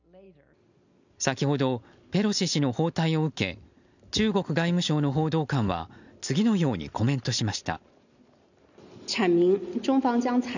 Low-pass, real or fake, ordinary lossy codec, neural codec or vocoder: 7.2 kHz; real; none; none